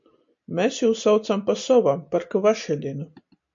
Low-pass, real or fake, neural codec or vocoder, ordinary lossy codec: 7.2 kHz; real; none; MP3, 48 kbps